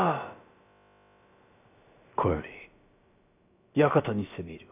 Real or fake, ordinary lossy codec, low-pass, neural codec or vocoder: fake; none; 3.6 kHz; codec, 16 kHz, about 1 kbps, DyCAST, with the encoder's durations